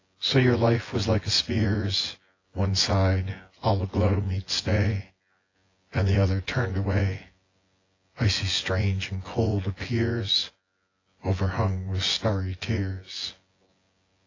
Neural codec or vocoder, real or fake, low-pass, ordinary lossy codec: vocoder, 24 kHz, 100 mel bands, Vocos; fake; 7.2 kHz; AAC, 32 kbps